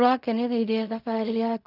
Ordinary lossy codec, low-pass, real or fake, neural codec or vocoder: none; 5.4 kHz; fake; codec, 16 kHz in and 24 kHz out, 0.4 kbps, LongCat-Audio-Codec, fine tuned four codebook decoder